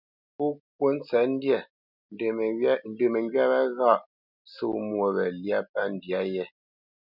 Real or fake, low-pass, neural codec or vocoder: real; 5.4 kHz; none